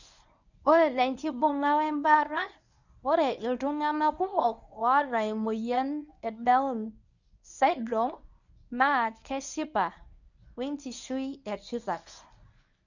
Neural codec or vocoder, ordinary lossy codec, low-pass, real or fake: codec, 24 kHz, 0.9 kbps, WavTokenizer, medium speech release version 1; none; 7.2 kHz; fake